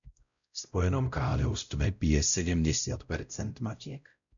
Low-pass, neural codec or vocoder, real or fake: 7.2 kHz; codec, 16 kHz, 0.5 kbps, X-Codec, WavLM features, trained on Multilingual LibriSpeech; fake